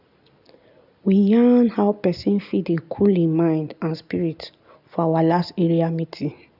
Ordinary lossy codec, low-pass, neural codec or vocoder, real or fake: none; 5.4 kHz; none; real